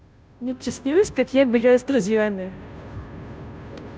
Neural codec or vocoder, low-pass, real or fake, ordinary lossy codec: codec, 16 kHz, 0.5 kbps, FunCodec, trained on Chinese and English, 25 frames a second; none; fake; none